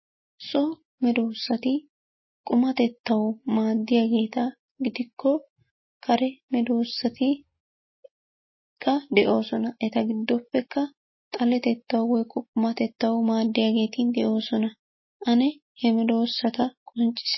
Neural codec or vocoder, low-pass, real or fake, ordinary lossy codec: none; 7.2 kHz; real; MP3, 24 kbps